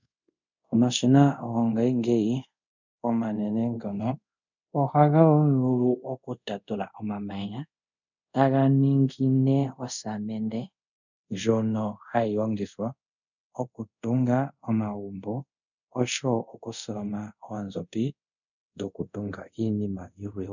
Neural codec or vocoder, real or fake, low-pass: codec, 24 kHz, 0.5 kbps, DualCodec; fake; 7.2 kHz